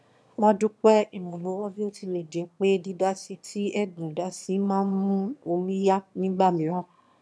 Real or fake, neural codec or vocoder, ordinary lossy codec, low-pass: fake; autoencoder, 22.05 kHz, a latent of 192 numbers a frame, VITS, trained on one speaker; none; none